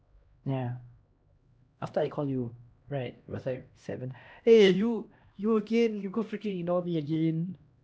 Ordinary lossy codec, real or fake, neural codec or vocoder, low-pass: none; fake; codec, 16 kHz, 1 kbps, X-Codec, HuBERT features, trained on LibriSpeech; none